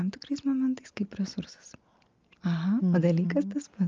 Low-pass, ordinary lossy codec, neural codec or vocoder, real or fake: 7.2 kHz; Opus, 24 kbps; none; real